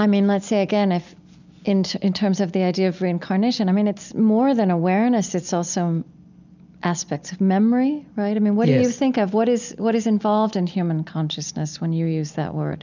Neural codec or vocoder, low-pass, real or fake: none; 7.2 kHz; real